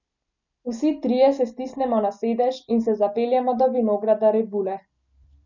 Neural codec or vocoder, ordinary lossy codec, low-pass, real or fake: none; none; 7.2 kHz; real